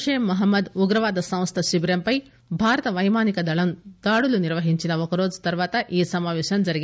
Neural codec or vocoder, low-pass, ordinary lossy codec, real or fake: none; none; none; real